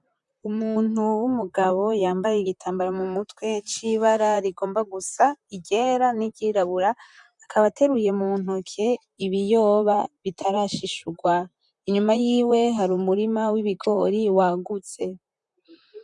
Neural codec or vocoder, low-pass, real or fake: vocoder, 44.1 kHz, 128 mel bands, Pupu-Vocoder; 10.8 kHz; fake